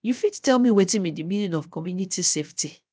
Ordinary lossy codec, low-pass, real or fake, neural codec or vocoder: none; none; fake; codec, 16 kHz, 0.7 kbps, FocalCodec